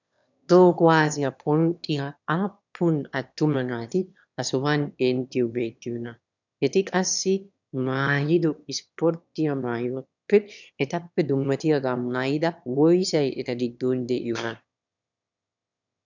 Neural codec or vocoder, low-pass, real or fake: autoencoder, 22.05 kHz, a latent of 192 numbers a frame, VITS, trained on one speaker; 7.2 kHz; fake